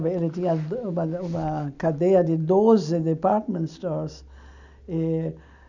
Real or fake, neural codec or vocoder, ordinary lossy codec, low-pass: real; none; none; 7.2 kHz